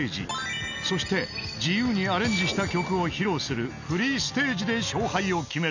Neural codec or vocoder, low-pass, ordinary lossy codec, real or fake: none; 7.2 kHz; none; real